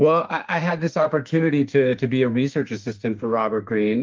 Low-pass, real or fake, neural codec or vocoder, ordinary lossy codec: 7.2 kHz; fake; autoencoder, 48 kHz, 32 numbers a frame, DAC-VAE, trained on Japanese speech; Opus, 32 kbps